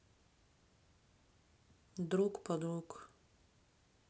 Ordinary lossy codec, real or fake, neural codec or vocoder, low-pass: none; real; none; none